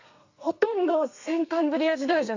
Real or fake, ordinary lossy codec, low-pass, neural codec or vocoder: fake; AAC, 48 kbps; 7.2 kHz; codec, 32 kHz, 1.9 kbps, SNAC